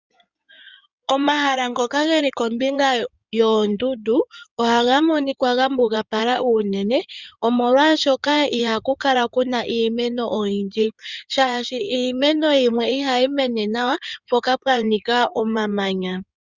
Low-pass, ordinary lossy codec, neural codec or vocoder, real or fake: 7.2 kHz; Opus, 64 kbps; codec, 16 kHz in and 24 kHz out, 2.2 kbps, FireRedTTS-2 codec; fake